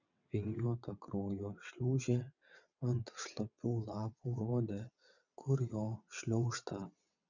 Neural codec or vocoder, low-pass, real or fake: vocoder, 22.05 kHz, 80 mel bands, WaveNeXt; 7.2 kHz; fake